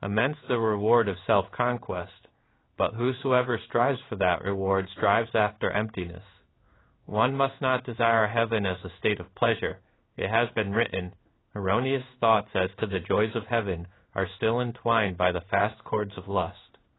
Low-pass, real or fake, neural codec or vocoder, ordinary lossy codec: 7.2 kHz; fake; codec, 16 kHz in and 24 kHz out, 1 kbps, XY-Tokenizer; AAC, 16 kbps